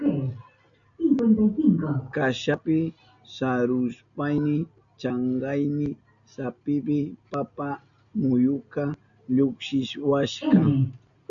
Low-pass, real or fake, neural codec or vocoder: 7.2 kHz; real; none